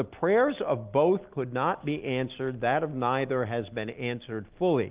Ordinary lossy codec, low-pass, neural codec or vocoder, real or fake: Opus, 32 kbps; 3.6 kHz; none; real